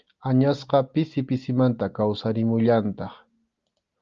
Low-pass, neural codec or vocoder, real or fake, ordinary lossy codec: 7.2 kHz; none; real; Opus, 32 kbps